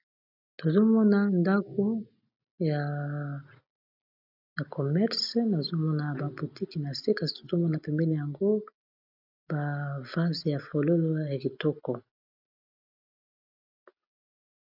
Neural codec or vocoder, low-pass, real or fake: none; 5.4 kHz; real